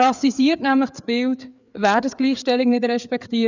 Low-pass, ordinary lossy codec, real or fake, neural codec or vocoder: 7.2 kHz; none; fake; codec, 16 kHz, 4 kbps, FreqCodec, larger model